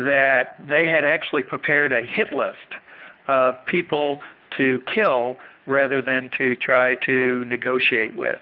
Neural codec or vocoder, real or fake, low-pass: codec, 24 kHz, 3 kbps, HILCodec; fake; 5.4 kHz